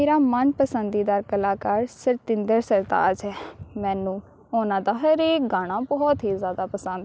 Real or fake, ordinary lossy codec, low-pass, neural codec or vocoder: real; none; none; none